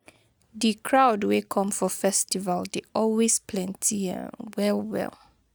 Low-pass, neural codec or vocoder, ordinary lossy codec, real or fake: none; none; none; real